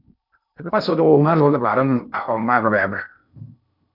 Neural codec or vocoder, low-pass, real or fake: codec, 16 kHz in and 24 kHz out, 0.6 kbps, FocalCodec, streaming, 2048 codes; 5.4 kHz; fake